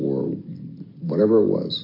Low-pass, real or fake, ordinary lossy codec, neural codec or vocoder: 5.4 kHz; real; MP3, 32 kbps; none